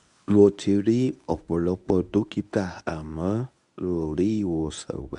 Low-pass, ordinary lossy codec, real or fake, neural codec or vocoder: 10.8 kHz; none; fake; codec, 24 kHz, 0.9 kbps, WavTokenizer, medium speech release version 1